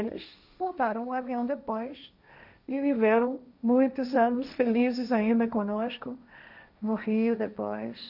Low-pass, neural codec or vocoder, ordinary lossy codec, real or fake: 5.4 kHz; codec, 16 kHz, 1.1 kbps, Voila-Tokenizer; none; fake